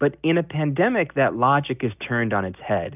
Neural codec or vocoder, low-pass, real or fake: none; 3.6 kHz; real